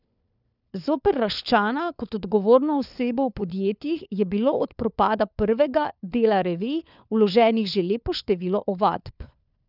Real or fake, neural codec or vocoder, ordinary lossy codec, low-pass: fake; codec, 16 kHz, 4 kbps, FunCodec, trained on LibriTTS, 50 frames a second; none; 5.4 kHz